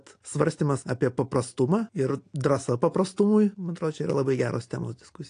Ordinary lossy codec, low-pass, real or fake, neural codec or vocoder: AAC, 48 kbps; 9.9 kHz; real; none